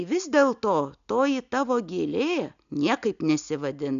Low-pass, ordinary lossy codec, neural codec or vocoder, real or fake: 7.2 kHz; AAC, 96 kbps; none; real